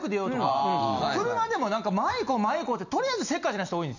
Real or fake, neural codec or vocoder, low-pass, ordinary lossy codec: real; none; 7.2 kHz; none